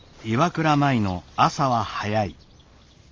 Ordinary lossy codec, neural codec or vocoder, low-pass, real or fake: Opus, 32 kbps; none; 7.2 kHz; real